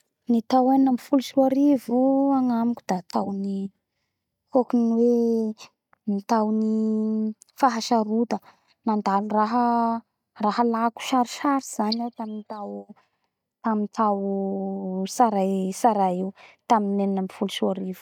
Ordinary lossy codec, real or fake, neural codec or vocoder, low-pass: none; real; none; 19.8 kHz